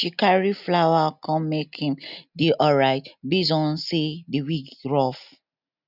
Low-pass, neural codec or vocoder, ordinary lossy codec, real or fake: 5.4 kHz; none; none; real